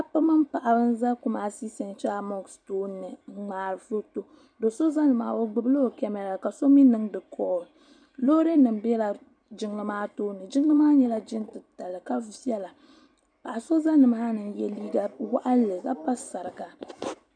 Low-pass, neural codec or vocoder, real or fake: 9.9 kHz; vocoder, 44.1 kHz, 128 mel bands every 256 samples, BigVGAN v2; fake